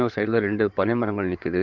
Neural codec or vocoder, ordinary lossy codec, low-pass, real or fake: codec, 16 kHz in and 24 kHz out, 2.2 kbps, FireRedTTS-2 codec; none; 7.2 kHz; fake